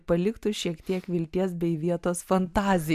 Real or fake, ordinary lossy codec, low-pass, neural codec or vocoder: real; MP3, 96 kbps; 14.4 kHz; none